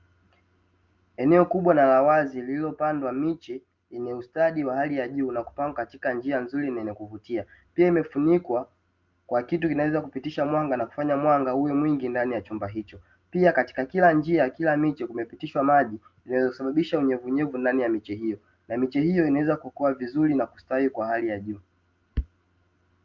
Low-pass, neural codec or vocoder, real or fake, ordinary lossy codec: 7.2 kHz; none; real; Opus, 32 kbps